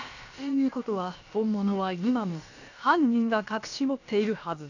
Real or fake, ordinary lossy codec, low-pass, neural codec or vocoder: fake; none; 7.2 kHz; codec, 16 kHz, about 1 kbps, DyCAST, with the encoder's durations